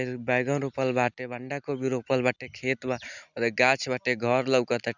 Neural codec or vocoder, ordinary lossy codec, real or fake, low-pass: none; none; real; 7.2 kHz